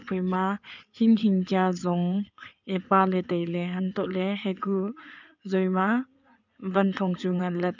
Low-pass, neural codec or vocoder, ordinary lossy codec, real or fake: 7.2 kHz; codec, 16 kHz in and 24 kHz out, 2.2 kbps, FireRedTTS-2 codec; none; fake